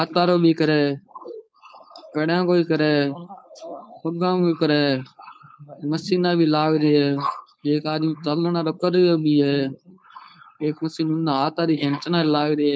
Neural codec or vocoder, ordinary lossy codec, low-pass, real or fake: codec, 16 kHz, 4.8 kbps, FACodec; none; none; fake